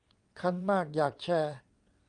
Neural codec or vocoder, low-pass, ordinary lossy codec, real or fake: vocoder, 22.05 kHz, 80 mel bands, WaveNeXt; 9.9 kHz; Opus, 32 kbps; fake